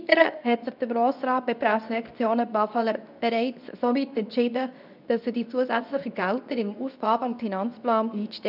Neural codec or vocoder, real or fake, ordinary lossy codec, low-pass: codec, 24 kHz, 0.9 kbps, WavTokenizer, medium speech release version 2; fake; none; 5.4 kHz